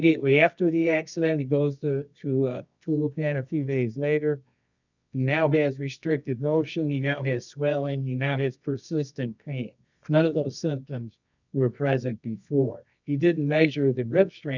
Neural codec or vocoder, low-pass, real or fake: codec, 24 kHz, 0.9 kbps, WavTokenizer, medium music audio release; 7.2 kHz; fake